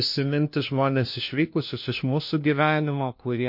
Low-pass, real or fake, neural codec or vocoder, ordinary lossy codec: 5.4 kHz; fake; codec, 16 kHz, 1 kbps, FunCodec, trained on LibriTTS, 50 frames a second; MP3, 32 kbps